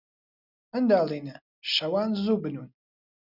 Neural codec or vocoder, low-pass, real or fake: none; 5.4 kHz; real